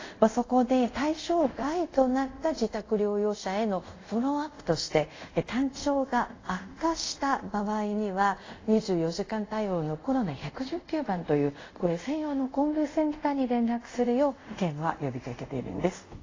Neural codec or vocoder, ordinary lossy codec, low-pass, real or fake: codec, 24 kHz, 0.5 kbps, DualCodec; AAC, 32 kbps; 7.2 kHz; fake